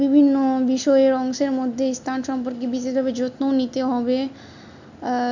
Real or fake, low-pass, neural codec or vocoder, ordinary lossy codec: real; 7.2 kHz; none; none